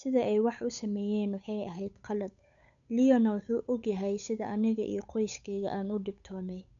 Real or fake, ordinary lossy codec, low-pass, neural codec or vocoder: fake; none; 7.2 kHz; codec, 16 kHz, 8 kbps, FunCodec, trained on Chinese and English, 25 frames a second